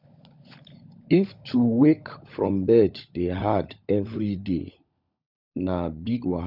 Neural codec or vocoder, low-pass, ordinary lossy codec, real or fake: codec, 16 kHz, 16 kbps, FunCodec, trained on LibriTTS, 50 frames a second; 5.4 kHz; none; fake